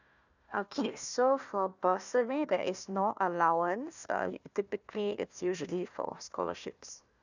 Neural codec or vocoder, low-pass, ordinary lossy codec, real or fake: codec, 16 kHz, 1 kbps, FunCodec, trained on Chinese and English, 50 frames a second; 7.2 kHz; none; fake